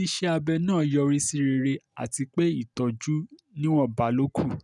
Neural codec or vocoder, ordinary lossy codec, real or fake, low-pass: none; none; real; 10.8 kHz